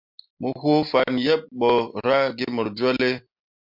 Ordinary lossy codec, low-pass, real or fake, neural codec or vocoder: MP3, 48 kbps; 5.4 kHz; real; none